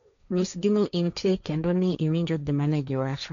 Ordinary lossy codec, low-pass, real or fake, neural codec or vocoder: none; 7.2 kHz; fake; codec, 16 kHz, 1.1 kbps, Voila-Tokenizer